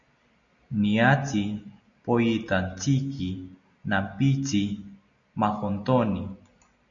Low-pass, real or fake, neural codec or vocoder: 7.2 kHz; real; none